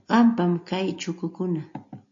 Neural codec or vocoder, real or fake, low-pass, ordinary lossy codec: none; real; 7.2 kHz; AAC, 48 kbps